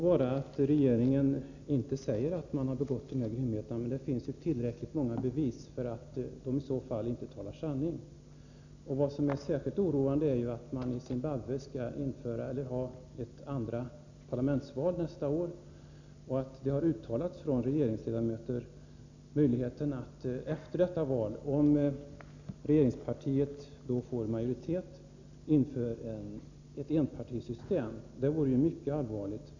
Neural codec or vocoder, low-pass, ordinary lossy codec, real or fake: none; 7.2 kHz; none; real